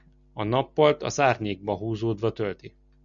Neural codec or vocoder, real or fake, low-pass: none; real; 7.2 kHz